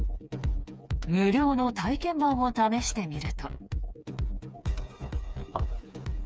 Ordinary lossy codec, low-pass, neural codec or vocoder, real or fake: none; none; codec, 16 kHz, 4 kbps, FreqCodec, smaller model; fake